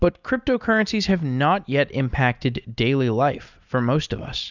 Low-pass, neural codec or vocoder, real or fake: 7.2 kHz; none; real